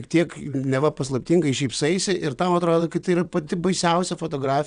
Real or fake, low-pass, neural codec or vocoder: fake; 9.9 kHz; vocoder, 22.05 kHz, 80 mel bands, WaveNeXt